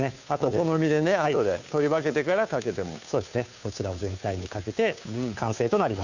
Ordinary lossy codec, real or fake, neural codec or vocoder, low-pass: none; fake; codec, 16 kHz, 2 kbps, FunCodec, trained on Chinese and English, 25 frames a second; 7.2 kHz